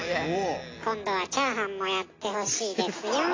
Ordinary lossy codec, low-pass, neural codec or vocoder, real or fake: AAC, 32 kbps; 7.2 kHz; none; real